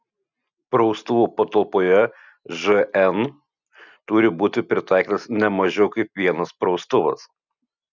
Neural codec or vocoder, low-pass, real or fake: none; 7.2 kHz; real